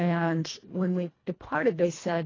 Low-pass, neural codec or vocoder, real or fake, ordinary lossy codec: 7.2 kHz; codec, 24 kHz, 1.5 kbps, HILCodec; fake; AAC, 32 kbps